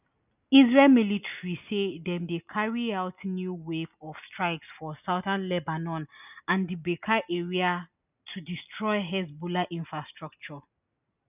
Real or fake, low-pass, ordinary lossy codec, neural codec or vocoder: real; 3.6 kHz; none; none